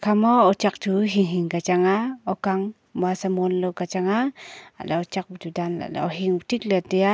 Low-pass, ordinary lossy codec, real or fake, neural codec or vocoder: none; none; real; none